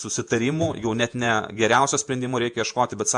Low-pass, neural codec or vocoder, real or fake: 10.8 kHz; vocoder, 48 kHz, 128 mel bands, Vocos; fake